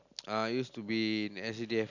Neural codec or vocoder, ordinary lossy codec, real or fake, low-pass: none; none; real; 7.2 kHz